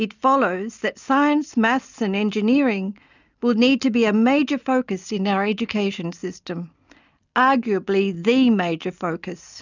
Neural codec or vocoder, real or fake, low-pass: none; real; 7.2 kHz